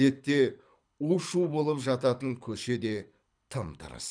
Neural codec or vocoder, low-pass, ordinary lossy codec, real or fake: codec, 24 kHz, 6 kbps, HILCodec; 9.9 kHz; none; fake